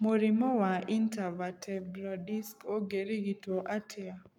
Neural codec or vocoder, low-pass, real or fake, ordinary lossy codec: codec, 44.1 kHz, 7.8 kbps, Pupu-Codec; 19.8 kHz; fake; none